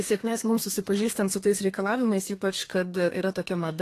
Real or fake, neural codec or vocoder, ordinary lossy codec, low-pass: fake; codec, 32 kHz, 1.9 kbps, SNAC; AAC, 48 kbps; 14.4 kHz